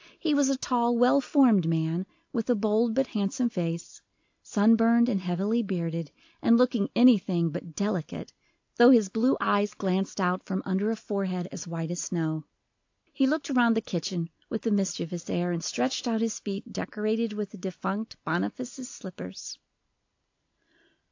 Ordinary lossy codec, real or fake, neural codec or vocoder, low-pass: AAC, 48 kbps; real; none; 7.2 kHz